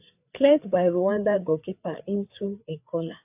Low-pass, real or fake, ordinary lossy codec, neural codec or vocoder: 3.6 kHz; fake; AAC, 32 kbps; codec, 16 kHz, 4 kbps, FreqCodec, larger model